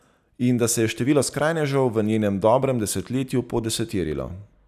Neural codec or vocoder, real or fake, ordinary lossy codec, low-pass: none; real; none; 14.4 kHz